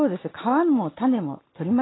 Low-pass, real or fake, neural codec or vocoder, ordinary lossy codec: 7.2 kHz; real; none; AAC, 16 kbps